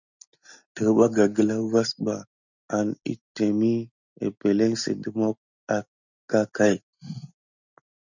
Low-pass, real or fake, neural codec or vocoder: 7.2 kHz; real; none